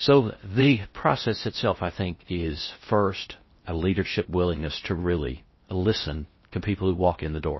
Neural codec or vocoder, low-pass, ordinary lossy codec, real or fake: codec, 16 kHz in and 24 kHz out, 0.6 kbps, FocalCodec, streaming, 4096 codes; 7.2 kHz; MP3, 24 kbps; fake